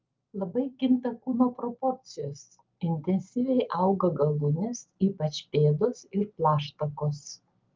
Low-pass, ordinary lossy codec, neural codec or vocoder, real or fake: 7.2 kHz; Opus, 32 kbps; none; real